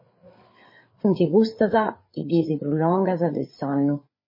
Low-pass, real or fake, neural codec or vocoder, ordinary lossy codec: 5.4 kHz; fake; codec, 16 kHz, 4 kbps, FreqCodec, larger model; MP3, 24 kbps